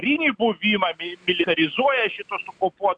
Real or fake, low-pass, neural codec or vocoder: real; 9.9 kHz; none